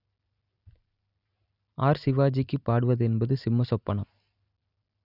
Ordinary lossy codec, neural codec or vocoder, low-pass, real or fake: none; none; 5.4 kHz; real